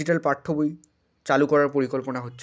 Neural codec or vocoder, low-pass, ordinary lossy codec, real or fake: none; none; none; real